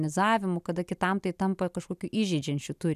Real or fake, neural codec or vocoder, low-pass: real; none; 14.4 kHz